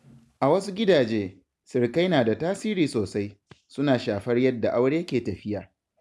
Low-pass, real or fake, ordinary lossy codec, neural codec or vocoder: none; real; none; none